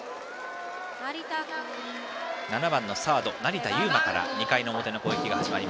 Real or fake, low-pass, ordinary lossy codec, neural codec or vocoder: real; none; none; none